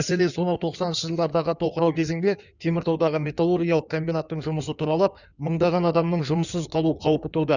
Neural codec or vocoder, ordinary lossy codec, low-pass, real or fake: codec, 16 kHz in and 24 kHz out, 1.1 kbps, FireRedTTS-2 codec; none; 7.2 kHz; fake